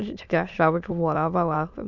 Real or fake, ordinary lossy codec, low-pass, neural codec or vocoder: fake; none; 7.2 kHz; autoencoder, 22.05 kHz, a latent of 192 numbers a frame, VITS, trained on many speakers